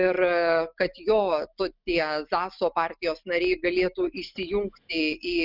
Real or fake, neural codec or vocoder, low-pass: real; none; 5.4 kHz